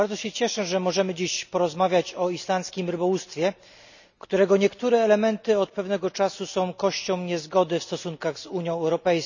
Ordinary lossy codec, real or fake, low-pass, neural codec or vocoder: none; real; 7.2 kHz; none